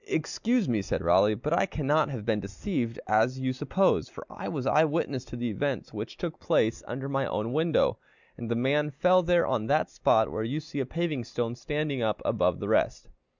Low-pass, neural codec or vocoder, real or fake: 7.2 kHz; none; real